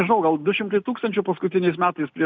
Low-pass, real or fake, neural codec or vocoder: 7.2 kHz; real; none